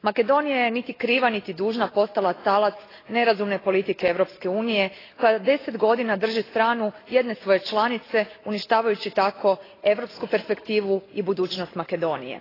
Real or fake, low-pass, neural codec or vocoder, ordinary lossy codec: real; 5.4 kHz; none; AAC, 24 kbps